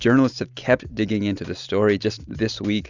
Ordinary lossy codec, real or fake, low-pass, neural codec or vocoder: Opus, 64 kbps; real; 7.2 kHz; none